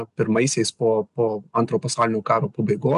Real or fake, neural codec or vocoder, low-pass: real; none; 10.8 kHz